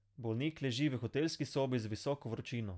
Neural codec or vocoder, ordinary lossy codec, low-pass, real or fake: none; none; none; real